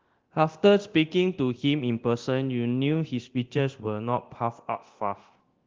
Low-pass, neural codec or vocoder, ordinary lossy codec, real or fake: 7.2 kHz; codec, 24 kHz, 0.9 kbps, DualCodec; Opus, 16 kbps; fake